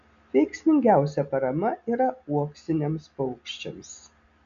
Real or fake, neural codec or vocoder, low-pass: real; none; 7.2 kHz